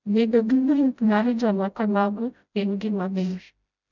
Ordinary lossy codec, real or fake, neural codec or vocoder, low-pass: none; fake; codec, 16 kHz, 0.5 kbps, FreqCodec, smaller model; 7.2 kHz